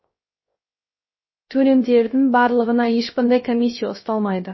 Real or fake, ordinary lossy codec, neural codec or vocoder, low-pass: fake; MP3, 24 kbps; codec, 16 kHz, 0.3 kbps, FocalCodec; 7.2 kHz